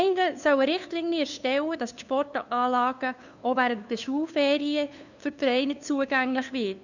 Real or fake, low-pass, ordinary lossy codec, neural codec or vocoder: fake; 7.2 kHz; none; codec, 16 kHz, 2 kbps, FunCodec, trained on LibriTTS, 25 frames a second